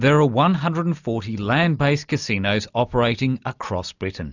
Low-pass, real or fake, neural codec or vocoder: 7.2 kHz; real; none